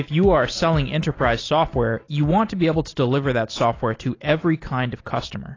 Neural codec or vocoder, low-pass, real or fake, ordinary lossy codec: none; 7.2 kHz; real; AAC, 32 kbps